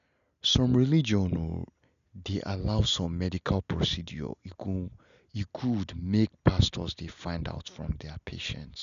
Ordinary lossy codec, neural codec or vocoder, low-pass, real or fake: none; none; 7.2 kHz; real